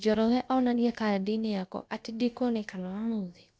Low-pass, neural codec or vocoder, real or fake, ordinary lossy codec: none; codec, 16 kHz, about 1 kbps, DyCAST, with the encoder's durations; fake; none